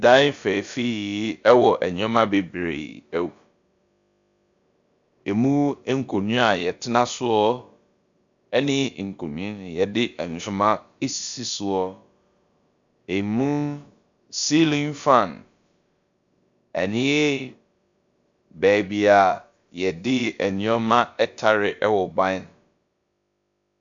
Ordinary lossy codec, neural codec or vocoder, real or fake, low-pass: MP3, 64 kbps; codec, 16 kHz, about 1 kbps, DyCAST, with the encoder's durations; fake; 7.2 kHz